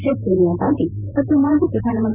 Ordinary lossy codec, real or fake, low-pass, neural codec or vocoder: none; fake; 3.6 kHz; vocoder, 44.1 kHz, 128 mel bands, Pupu-Vocoder